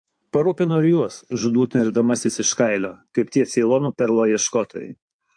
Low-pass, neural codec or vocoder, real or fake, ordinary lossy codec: 9.9 kHz; codec, 16 kHz in and 24 kHz out, 2.2 kbps, FireRedTTS-2 codec; fake; AAC, 64 kbps